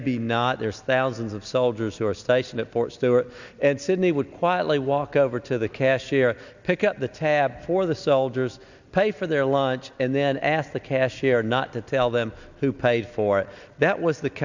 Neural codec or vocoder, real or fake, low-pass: none; real; 7.2 kHz